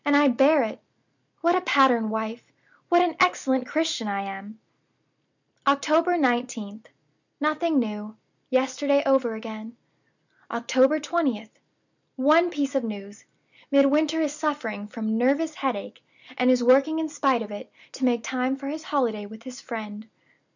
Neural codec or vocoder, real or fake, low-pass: none; real; 7.2 kHz